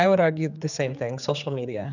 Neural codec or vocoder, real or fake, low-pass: codec, 16 kHz, 4 kbps, X-Codec, HuBERT features, trained on general audio; fake; 7.2 kHz